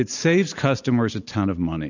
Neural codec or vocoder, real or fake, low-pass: none; real; 7.2 kHz